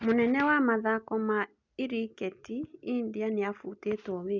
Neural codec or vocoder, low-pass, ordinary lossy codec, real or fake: none; 7.2 kHz; none; real